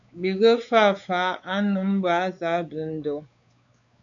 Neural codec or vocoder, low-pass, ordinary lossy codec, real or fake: codec, 16 kHz, 4 kbps, X-Codec, WavLM features, trained on Multilingual LibriSpeech; 7.2 kHz; AAC, 64 kbps; fake